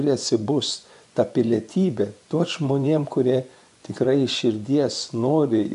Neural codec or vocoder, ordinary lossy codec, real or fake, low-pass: vocoder, 24 kHz, 100 mel bands, Vocos; AAC, 96 kbps; fake; 10.8 kHz